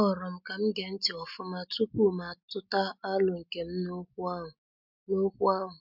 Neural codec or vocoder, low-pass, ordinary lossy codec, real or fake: none; 5.4 kHz; MP3, 48 kbps; real